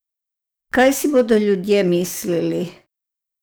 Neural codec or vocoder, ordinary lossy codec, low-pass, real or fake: codec, 44.1 kHz, 7.8 kbps, DAC; none; none; fake